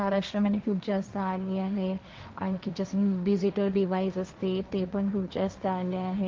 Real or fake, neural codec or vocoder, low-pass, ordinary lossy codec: fake; codec, 16 kHz, 1.1 kbps, Voila-Tokenizer; 7.2 kHz; Opus, 24 kbps